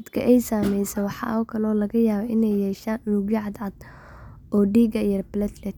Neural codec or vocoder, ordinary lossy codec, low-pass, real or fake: none; none; 19.8 kHz; real